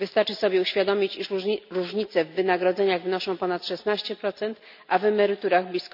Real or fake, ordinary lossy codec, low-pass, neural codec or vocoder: real; none; 5.4 kHz; none